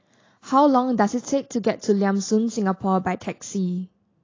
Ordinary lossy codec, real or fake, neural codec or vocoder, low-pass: AAC, 32 kbps; real; none; 7.2 kHz